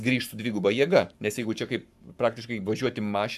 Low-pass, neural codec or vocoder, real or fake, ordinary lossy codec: 14.4 kHz; codec, 44.1 kHz, 7.8 kbps, Pupu-Codec; fake; AAC, 96 kbps